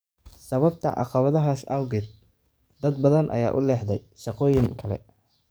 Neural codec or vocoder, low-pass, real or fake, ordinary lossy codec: codec, 44.1 kHz, 7.8 kbps, DAC; none; fake; none